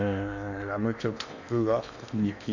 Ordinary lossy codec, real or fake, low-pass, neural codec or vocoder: none; fake; 7.2 kHz; codec, 16 kHz in and 24 kHz out, 0.8 kbps, FocalCodec, streaming, 65536 codes